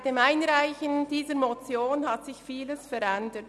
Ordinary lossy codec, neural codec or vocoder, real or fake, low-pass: none; none; real; none